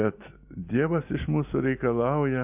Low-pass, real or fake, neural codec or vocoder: 3.6 kHz; real; none